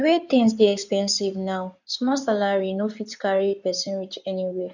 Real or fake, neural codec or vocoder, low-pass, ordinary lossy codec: fake; codec, 16 kHz in and 24 kHz out, 2.2 kbps, FireRedTTS-2 codec; 7.2 kHz; none